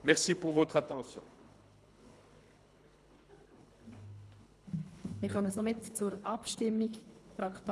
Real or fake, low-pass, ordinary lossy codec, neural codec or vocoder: fake; none; none; codec, 24 kHz, 3 kbps, HILCodec